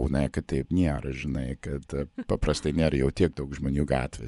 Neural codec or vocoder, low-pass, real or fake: vocoder, 24 kHz, 100 mel bands, Vocos; 10.8 kHz; fake